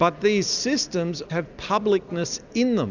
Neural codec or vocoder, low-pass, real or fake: none; 7.2 kHz; real